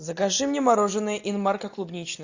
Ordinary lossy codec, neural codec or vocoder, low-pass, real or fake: AAC, 48 kbps; none; 7.2 kHz; real